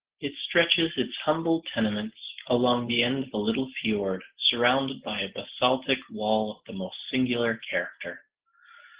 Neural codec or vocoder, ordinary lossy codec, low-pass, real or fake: none; Opus, 16 kbps; 3.6 kHz; real